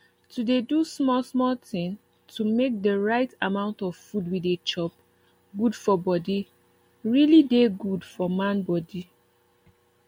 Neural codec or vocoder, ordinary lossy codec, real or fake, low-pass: none; MP3, 64 kbps; real; 19.8 kHz